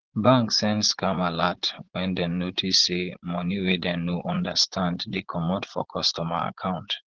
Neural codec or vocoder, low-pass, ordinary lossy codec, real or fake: vocoder, 44.1 kHz, 80 mel bands, Vocos; 7.2 kHz; Opus, 16 kbps; fake